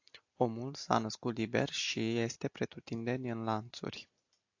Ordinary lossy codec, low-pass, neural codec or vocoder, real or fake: AAC, 48 kbps; 7.2 kHz; none; real